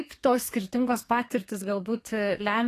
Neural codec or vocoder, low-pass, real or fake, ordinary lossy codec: codec, 32 kHz, 1.9 kbps, SNAC; 14.4 kHz; fake; AAC, 48 kbps